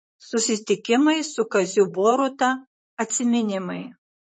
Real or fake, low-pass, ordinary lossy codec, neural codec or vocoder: fake; 9.9 kHz; MP3, 32 kbps; vocoder, 44.1 kHz, 128 mel bands, Pupu-Vocoder